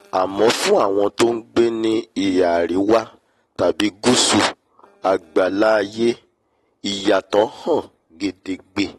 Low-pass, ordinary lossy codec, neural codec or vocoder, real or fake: 14.4 kHz; AAC, 32 kbps; none; real